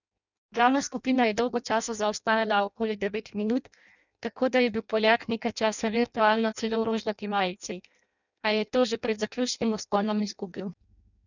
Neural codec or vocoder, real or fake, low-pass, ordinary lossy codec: codec, 16 kHz in and 24 kHz out, 0.6 kbps, FireRedTTS-2 codec; fake; 7.2 kHz; none